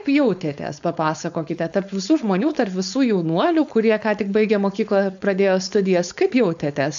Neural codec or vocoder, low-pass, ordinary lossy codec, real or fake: codec, 16 kHz, 4.8 kbps, FACodec; 7.2 kHz; AAC, 96 kbps; fake